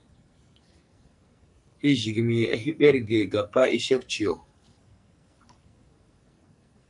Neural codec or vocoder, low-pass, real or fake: codec, 44.1 kHz, 2.6 kbps, SNAC; 10.8 kHz; fake